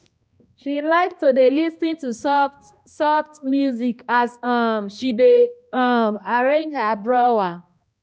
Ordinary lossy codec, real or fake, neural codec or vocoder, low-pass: none; fake; codec, 16 kHz, 1 kbps, X-Codec, HuBERT features, trained on balanced general audio; none